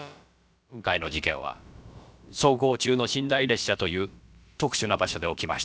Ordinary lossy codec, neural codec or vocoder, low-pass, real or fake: none; codec, 16 kHz, about 1 kbps, DyCAST, with the encoder's durations; none; fake